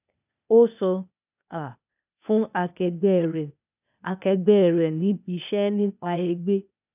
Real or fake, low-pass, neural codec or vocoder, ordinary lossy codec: fake; 3.6 kHz; codec, 16 kHz, 0.8 kbps, ZipCodec; none